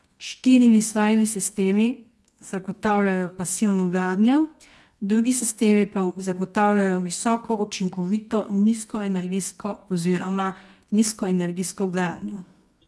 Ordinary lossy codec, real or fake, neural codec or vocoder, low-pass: none; fake; codec, 24 kHz, 0.9 kbps, WavTokenizer, medium music audio release; none